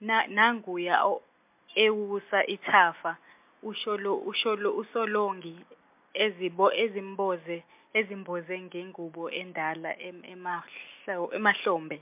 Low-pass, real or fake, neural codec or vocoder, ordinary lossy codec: 3.6 kHz; real; none; MP3, 32 kbps